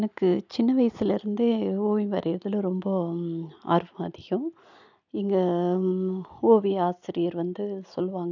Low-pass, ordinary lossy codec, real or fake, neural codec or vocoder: 7.2 kHz; none; real; none